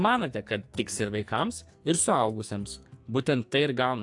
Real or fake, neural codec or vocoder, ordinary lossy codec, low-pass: fake; codec, 44.1 kHz, 2.6 kbps, SNAC; AAC, 64 kbps; 10.8 kHz